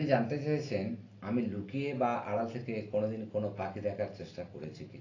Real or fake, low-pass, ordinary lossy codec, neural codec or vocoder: real; 7.2 kHz; AAC, 32 kbps; none